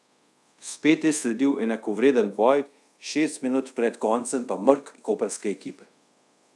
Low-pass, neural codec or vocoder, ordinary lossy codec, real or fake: none; codec, 24 kHz, 0.5 kbps, DualCodec; none; fake